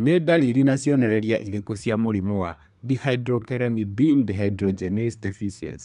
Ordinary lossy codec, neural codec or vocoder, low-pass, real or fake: none; codec, 24 kHz, 1 kbps, SNAC; 10.8 kHz; fake